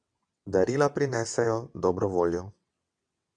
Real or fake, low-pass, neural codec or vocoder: fake; 9.9 kHz; vocoder, 22.05 kHz, 80 mel bands, WaveNeXt